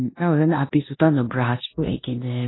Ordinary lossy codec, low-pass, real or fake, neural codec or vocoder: AAC, 16 kbps; 7.2 kHz; fake; codec, 16 kHz in and 24 kHz out, 0.9 kbps, LongCat-Audio-Codec, four codebook decoder